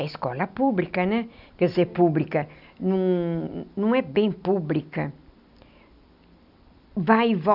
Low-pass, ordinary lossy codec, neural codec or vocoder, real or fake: 5.4 kHz; none; none; real